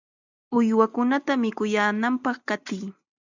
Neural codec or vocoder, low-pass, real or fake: none; 7.2 kHz; real